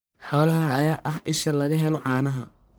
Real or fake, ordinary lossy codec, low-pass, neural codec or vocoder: fake; none; none; codec, 44.1 kHz, 1.7 kbps, Pupu-Codec